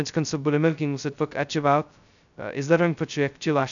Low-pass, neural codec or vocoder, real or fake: 7.2 kHz; codec, 16 kHz, 0.2 kbps, FocalCodec; fake